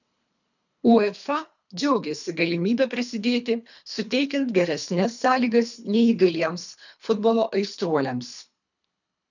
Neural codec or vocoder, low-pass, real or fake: codec, 24 kHz, 3 kbps, HILCodec; 7.2 kHz; fake